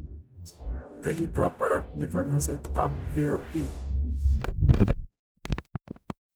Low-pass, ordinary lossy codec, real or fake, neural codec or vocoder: none; none; fake; codec, 44.1 kHz, 0.9 kbps, DAC